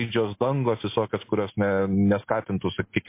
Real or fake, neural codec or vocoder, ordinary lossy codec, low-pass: real; none; MP3, 24 kbps; 3.6 kHz